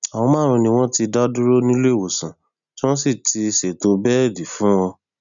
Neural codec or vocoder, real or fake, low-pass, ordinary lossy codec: none; real; 7.2 kHz; none